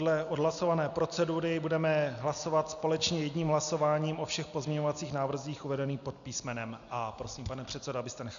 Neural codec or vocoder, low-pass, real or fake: none; 7.2 kHz; real